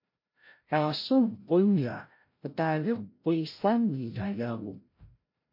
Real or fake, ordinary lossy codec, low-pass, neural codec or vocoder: fake; MP3, 32 kbps; 5.4 kHz; codec, 16 kHz, 0.5 kbps, FreqCodec, larger model